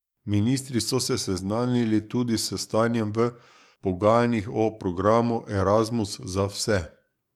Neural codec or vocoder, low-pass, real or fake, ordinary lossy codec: codec, 44.1 kHz, 7.8 kbps, DAC; 19.8 kHz; fake; MP3, 96 kbps